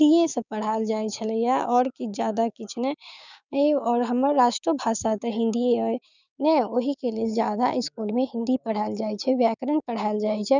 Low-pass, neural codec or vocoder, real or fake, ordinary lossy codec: 7.2 kHz; codec, 16 kHz, 6 kbps, DAC; fake; none